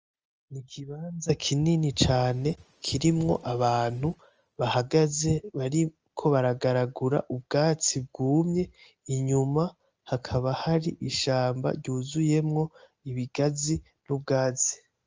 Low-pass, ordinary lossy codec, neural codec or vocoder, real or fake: 7.2 kHz; Opus, 24 kbps; none; real